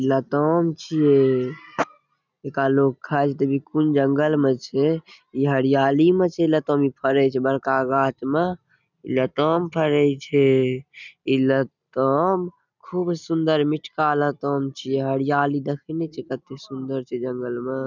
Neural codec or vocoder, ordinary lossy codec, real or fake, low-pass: none; none; real; 7.2 kHz